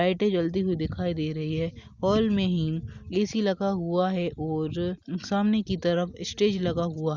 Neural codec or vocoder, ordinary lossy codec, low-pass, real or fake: none; Opus, 64 kbps; 7.2 kHz; real